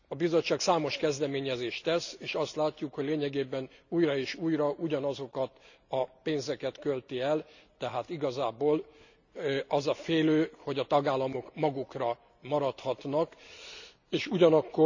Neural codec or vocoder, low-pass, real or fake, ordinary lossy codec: none; 7.2 kHz; real; none